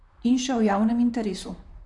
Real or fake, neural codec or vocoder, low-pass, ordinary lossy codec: fake; vocoder, 44.1 kHz, 128 mel bands, Pupu-Vocoder; 10.8 kHz; none